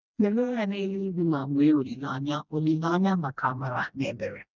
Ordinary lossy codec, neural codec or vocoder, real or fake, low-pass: none; codec, 16 kHz, 1 kbps, FreqCodec, smaller model; fake; 7.2 kHz